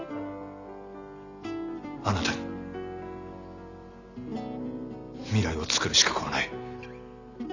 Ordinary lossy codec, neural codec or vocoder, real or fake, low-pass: Opus, 64 kbps; none; real; 7.2 kHz